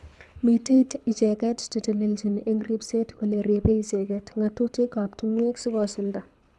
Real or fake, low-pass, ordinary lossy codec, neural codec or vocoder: fake; none; none; codec, 24 kHz, 6 kbps, HILCodec